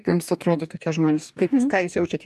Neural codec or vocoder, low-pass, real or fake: codec, 44.1 kHz, 2.6 kbps, DAC; 14.4 kHz; fake